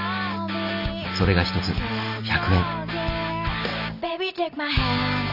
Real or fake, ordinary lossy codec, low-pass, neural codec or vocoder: real; none; 5.4 kHz; none